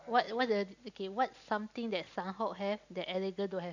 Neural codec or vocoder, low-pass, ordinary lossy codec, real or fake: none; 7.2 kHz; none; real